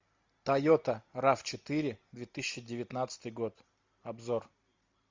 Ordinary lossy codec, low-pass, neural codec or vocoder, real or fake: MP3, 64 kbps; 7.2 kHz; none; real